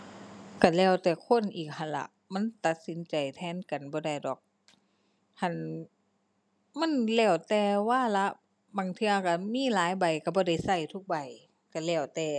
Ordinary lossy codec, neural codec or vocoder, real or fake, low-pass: none; none; real; none